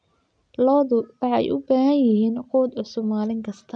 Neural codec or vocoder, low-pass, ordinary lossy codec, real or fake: none; 9.9 kHz; none; real